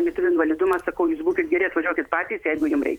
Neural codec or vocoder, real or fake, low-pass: vocoder, 44.1 kHz, 128 mel bands every 512 samples, BigVGAN v2; fake; 19.8 kHz